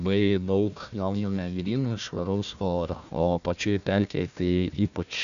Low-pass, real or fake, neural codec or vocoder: 7.2 kHz; fake; codec, 16 kHz, 1 kbps, FunCodec, trained on Chinese and English, 50 frames a second